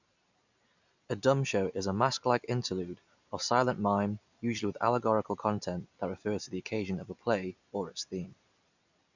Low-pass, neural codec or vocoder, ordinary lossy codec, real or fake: 7.2 kHz; none; none; real